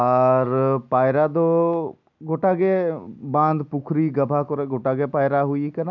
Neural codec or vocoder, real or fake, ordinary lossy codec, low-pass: none; real; none; 7.2 kHz